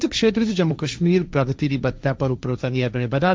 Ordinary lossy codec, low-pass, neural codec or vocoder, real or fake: none; none; codec, 16 kHz, 1.1 kbps, Voila-Tokenizer; fake